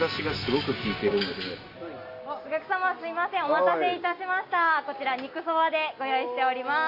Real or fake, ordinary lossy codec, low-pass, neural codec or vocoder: real; AAC, 32 kbps; 5.4 kHz; none